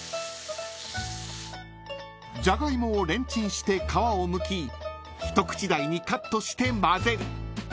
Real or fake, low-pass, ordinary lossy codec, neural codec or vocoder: real; none; none; none